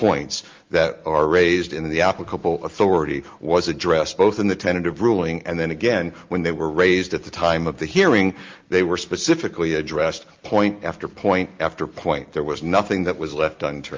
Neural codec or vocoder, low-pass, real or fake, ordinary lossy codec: none; 7.2 kHz; real; Opus, 32 kbps